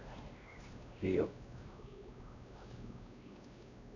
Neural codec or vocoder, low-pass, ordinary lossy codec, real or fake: codec, 16 kHz, 1 kbps, X-Codec, WavLM features, trained on Multilingual LibriSpeech; 7.2 kHz; none; fake